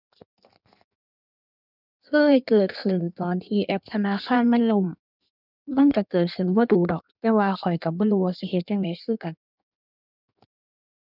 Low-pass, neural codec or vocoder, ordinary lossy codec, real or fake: 5.4 kHz; codec, 16 kHz in and 24 kHz out, 1.1 kbps, FireRedTTS-2 codec; none; fake